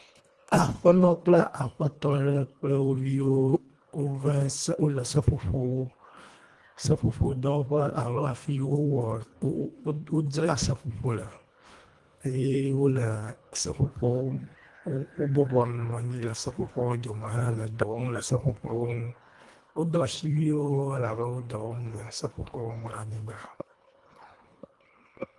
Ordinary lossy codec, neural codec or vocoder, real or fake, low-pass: Opus, 24 kbps; codec, 24 kHz, 1.5 kbps, HILCodec; fake; 10.8 kHz